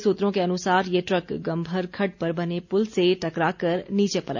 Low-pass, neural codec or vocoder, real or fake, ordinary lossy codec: 7.2 kHz; none; real; none